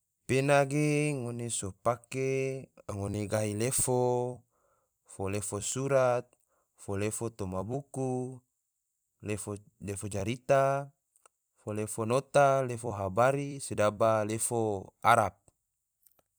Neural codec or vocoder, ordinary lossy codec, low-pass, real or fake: vocoder, 44.1 kHz, 128 mel bands every 256 samples, BigVGAN v2; none; none; fake